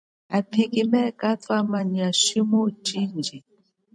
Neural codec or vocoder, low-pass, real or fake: vocoder, 44.1 kHz, 128 mel bands every 256 samples, BigVGAN v2; 9.9 kHz; fake